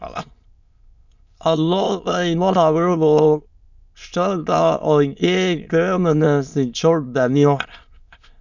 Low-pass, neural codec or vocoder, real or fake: 7.2 kHz; autoencoder, 22.05 kHz, a latent of 192 numbers a frame, VITS, trained on many speakers; fake